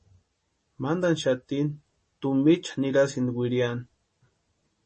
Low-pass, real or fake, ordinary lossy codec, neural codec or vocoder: 10.8 kHz; real; MP3, 32 kbps; none